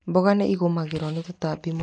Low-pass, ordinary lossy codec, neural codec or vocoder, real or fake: 9.9 kHz; none; none; real